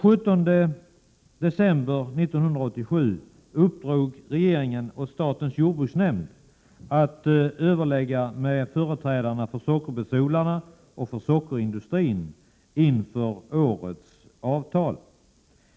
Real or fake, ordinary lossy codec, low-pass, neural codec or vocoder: real; none; none; none